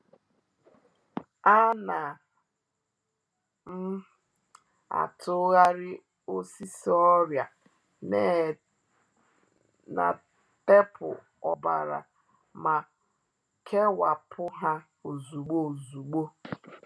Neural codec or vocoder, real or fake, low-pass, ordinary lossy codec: none; real; none; none